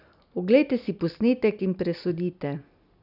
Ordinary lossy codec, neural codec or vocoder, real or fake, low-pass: none; none; real; 5.4 kHz